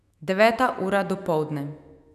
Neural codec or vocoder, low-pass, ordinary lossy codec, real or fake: autoencoder, 48 kHz, 128 numbers a frame, DAC-VAE, trained on Japanese speech; 14.4 kHz; none; fake